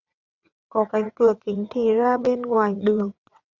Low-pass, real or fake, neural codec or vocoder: 7.2 kHz; fake; vocoder, 22.05 kHz, 80 mel bands, WaveNeXt